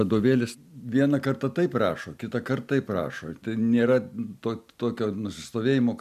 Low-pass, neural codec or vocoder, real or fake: 14.4 kHz; none; real